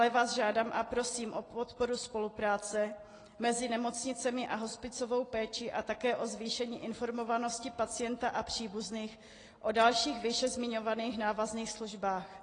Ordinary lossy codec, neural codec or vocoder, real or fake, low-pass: AAC, 32 kbps; none; real; 9.9 kHz